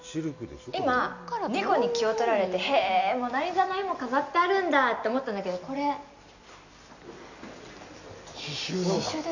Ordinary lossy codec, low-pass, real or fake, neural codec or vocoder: none; 7.2 kHz; real; none